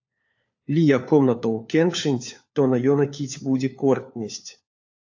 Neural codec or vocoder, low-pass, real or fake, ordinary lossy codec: codec, 16 kHz, 4 kbps, FunCodec, trained on LibriTTS, 50 frames a second; 7.2 kHz; fake; AAC, 48 kbps